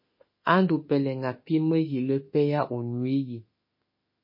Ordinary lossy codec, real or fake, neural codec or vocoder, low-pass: MP3, 24 kbps; fake; autoencoder, 48 kHz, 32 numbers a frame, DAC-VAE, trained on Japanese speech; 5.4 kHz